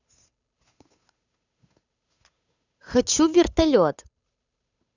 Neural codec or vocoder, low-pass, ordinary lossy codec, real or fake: codec, 16 kHz, 8 kbps, FunCodec, trained on Chinese and English, 25 frames a second; 7.2 kHz; none; fake